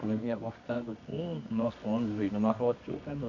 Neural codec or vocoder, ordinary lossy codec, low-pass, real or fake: codec, 24 kHz, 0.9 kbps, WavTokenizer, medium music audio release; none; 7.2 kHz; fake